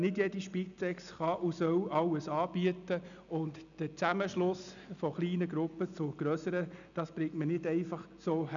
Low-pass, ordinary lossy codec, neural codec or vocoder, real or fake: 7.2 kHz; none; none; real